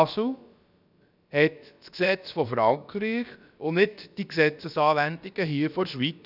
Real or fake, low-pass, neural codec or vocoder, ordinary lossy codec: fake; 5.4 kHz; codec, 16 kHz, about 1 kbps, DyCAST, with the encoder's durations; none